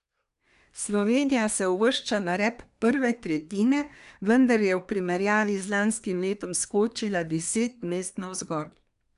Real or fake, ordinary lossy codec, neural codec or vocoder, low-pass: fake; none; codec, 24 kHz, 1 kbps, SNAC; 10.8 kHz